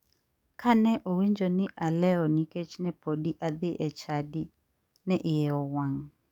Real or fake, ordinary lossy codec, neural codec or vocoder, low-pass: fake; none; codec, 44.1 kHz, 7.8 kbps, DAC; 19.8 kHz